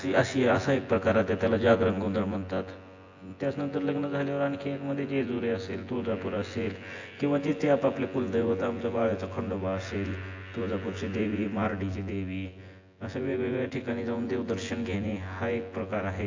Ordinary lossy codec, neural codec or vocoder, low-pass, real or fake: AAC, 48 kbps; vocoder, 24 kHz, 100 mel bands, Vocos; 7.2 kHz; fake